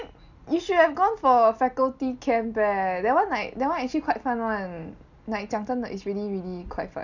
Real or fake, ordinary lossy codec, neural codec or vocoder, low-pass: real; none; none; 7.2 kHz